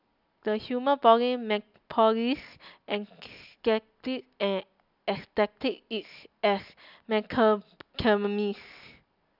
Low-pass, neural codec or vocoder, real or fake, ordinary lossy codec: 5.4 kHz; none; real; none